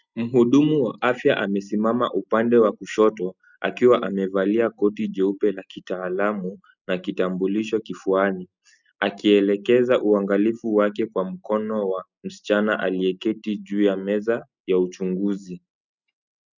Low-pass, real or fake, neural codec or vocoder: 7.2 kHz; real; none